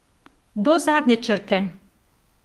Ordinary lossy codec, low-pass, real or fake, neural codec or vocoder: Opus, 32 kbps; 14.4 kHz; fake; codec, 32 kHz, 1.9 kbps, SNAC